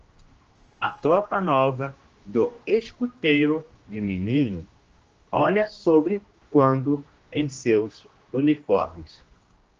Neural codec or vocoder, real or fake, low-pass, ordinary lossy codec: codec, 16 kHz, 1 kbps, X-Codec, HuBERT features, trained on general audio; fake; 7.2 kHz; Opus, 24 kbps